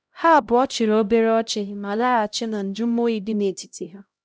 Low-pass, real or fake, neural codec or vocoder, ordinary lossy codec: none; fake; codec, 16 kHz, 0.5 kbps, X-Codec, HuBERT features, trained on LibriSpeech; none